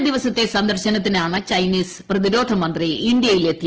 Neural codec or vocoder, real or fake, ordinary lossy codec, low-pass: none; real; Opus, 16 kbps; 7.2 kHz